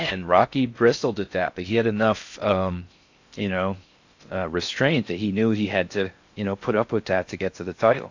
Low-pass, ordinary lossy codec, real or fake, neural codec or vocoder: 7.2 kHz; AAC, 48 kbps; fake; codec, 16 kHz in and 24 kHz out, 0.6 kbps, FocalCodec, streaming, 4096 codes